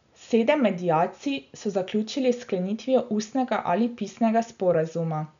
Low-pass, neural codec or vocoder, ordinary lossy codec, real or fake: 7.2 kHz; none; none; real